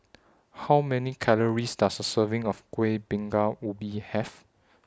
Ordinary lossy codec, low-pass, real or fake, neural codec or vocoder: none; none; real; none